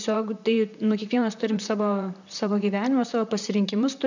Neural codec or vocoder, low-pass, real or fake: vocoder, 44.1 kHz, 128 mel bands, Pupu-Vocoder; 7.2 kHz; fake